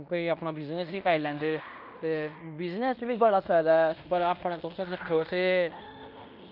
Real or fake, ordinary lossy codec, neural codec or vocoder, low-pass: fake; none; codec, 16 kHz in and 24 kHz out, 0.9 kbps, LongCat-Audio-Codec, fine tuned four codebook decoder; 5.4 kHz